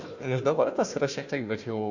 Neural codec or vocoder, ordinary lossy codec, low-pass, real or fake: codec, 16 kHz, 1 kbps, FunCodec, trained on Chinese and English, 50 frames a second; Opus, 64 kbps; 7.2 kHz; fake